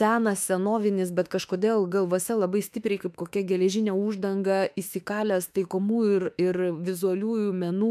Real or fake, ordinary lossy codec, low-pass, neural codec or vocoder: fake; MP3, 96 kbps; 14.4 kHz; autoencoder, 48 kHz, 32 numbers a frame, DAC-VAE, trained on Japanese speech